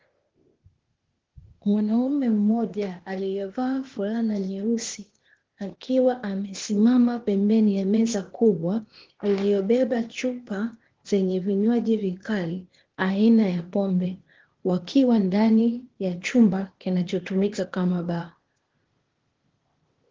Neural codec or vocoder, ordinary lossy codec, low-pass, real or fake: codec, 16 kHz, 0.8 kbps, ZipCodec; Opus, 16 kbps; 7.2 kHz; fake